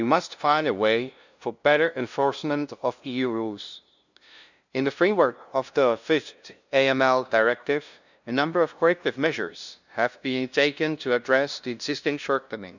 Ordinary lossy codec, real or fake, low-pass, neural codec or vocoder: none; fake; 7.2 kHz; codec, 16 kHz, 0.5 kbps, FunCodec, trained on LibriTTS, 25 frames a second